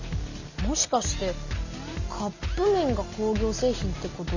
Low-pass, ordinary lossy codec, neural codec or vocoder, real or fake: 7.2 kHz; none; none; real